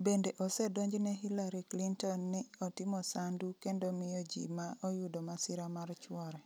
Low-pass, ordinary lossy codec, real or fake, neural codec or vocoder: none; none; real; none